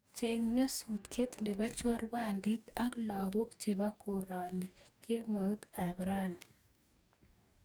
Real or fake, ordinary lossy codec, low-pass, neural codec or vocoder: fake; none; none; codec, 44.1 kHz, 2.6 kbps, DAC